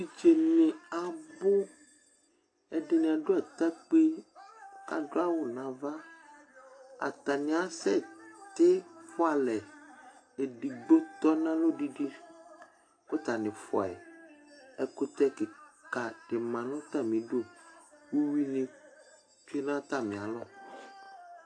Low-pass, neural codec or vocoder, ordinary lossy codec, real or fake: 9.9 kHz; none; AAC, 48 kbps; real